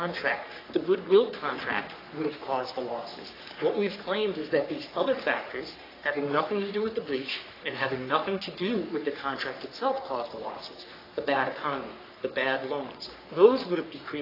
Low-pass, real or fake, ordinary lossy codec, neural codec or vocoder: 5.4 kHz; fake; AAC, 24 kbps; codec, 44.1 kHz, 3.4 kbps, Pupu-Codec